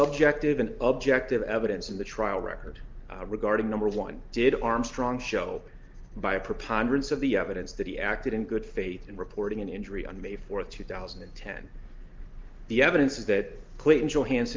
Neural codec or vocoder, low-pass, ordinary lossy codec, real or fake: none; 7.2 kHz; Opus, 32 kbps; real